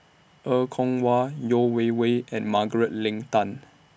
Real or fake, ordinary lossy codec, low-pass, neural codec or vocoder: real; none; none; none